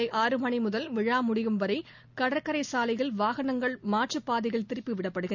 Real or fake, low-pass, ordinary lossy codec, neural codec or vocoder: real; 7.2 kHz; none; none